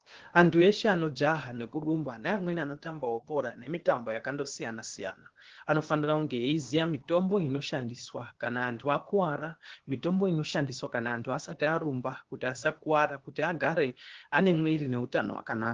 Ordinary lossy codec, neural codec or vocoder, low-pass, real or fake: Opus, 16 kbps; codec, 16 kHz, 0.8 kbps, ZipCodec; 7.2 kHz; fake